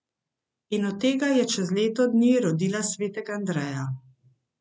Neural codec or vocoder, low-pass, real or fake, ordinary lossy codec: none; none; real; none